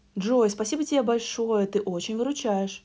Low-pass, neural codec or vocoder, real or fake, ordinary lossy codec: none; none; real; none